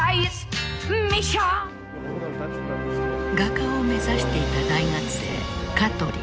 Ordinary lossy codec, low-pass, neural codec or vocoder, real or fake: none; none; none; real